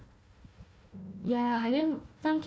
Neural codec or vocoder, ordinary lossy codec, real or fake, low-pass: codec, 16 kHz, 1 kbps, FunCodec, trained on Chinese and English, 50 frames a second; none; fake; none